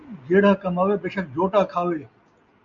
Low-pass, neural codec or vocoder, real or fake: 7.2 kHz; none; real